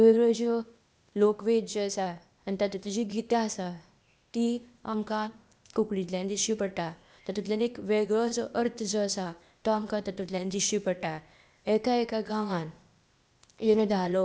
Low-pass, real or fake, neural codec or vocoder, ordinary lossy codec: none; fake; codec, 16 kHz, 0.8 kbps, ZipCodec; none